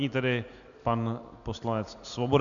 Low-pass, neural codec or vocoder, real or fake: 7.2 kHz; none; real